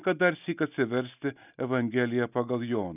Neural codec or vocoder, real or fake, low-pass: none; real; 3.6 kHz